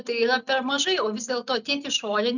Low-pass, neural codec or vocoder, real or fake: 7.2 kHz; none; real